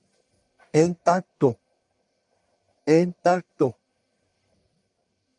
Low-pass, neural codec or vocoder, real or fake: 10.8 kHz; codec, 44.1 kHz, 1.7 kbps, Pupu-Codec; fake